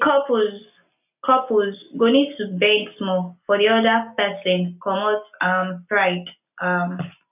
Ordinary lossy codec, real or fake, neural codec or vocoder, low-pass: none; real; none; 3.6 kHz